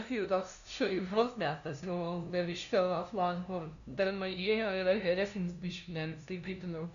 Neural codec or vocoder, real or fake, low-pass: codec, 16 kHz, 0.5 kbps, FunCodec, trained on LibriTTS, 25 frames a second; fake; 7.2 kHz